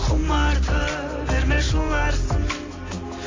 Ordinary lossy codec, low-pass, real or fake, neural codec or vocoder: AAC, 32 kbps; 7.2 kHz; real; none